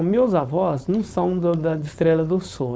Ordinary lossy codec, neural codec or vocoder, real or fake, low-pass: none; codec, 16 kHz, 4.8 kbps, FACodec; fake; none